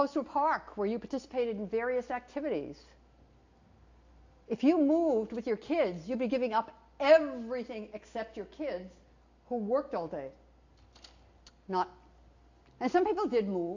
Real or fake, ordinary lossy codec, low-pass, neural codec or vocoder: real; AAC, 48 kbps; 7.2 kHz; none